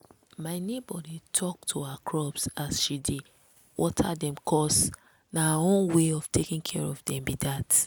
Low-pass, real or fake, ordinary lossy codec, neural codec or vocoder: none; real; none; none